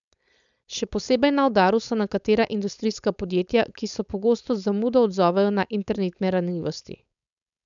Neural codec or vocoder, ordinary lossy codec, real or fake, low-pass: codec, 16 kHz, 4.8 kbps, FACodec; none; fake; 7.2 kHz